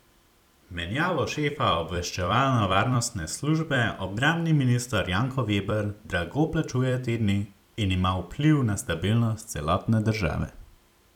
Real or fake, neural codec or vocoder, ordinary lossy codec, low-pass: fake; vocoder, 44.1 kHz, 128 mel bands every 256 samples, BigVGAN v2; none; 19.8 kHz